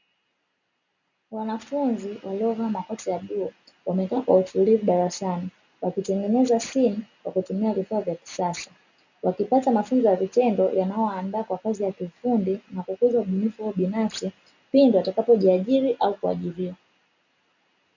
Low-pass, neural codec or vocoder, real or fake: 7.2 kHz; none; real